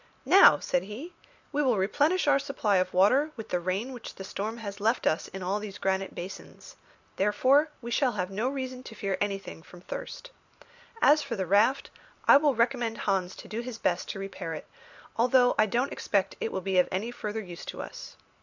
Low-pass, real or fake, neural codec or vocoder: 7.2 kHz; real; none